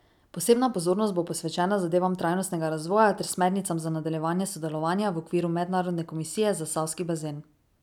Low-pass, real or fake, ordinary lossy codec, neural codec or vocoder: 19.8 kHz; real; none; none